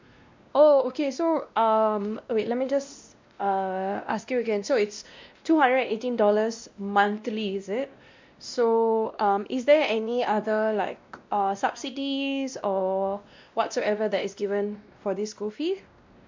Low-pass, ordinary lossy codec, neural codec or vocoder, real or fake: 7.2 kHz; MP3, 64 kbps; codec, 16 kHz, 1 kbps, X-Codec, WavLM features, trained on Multilingual LibriSpeech; fake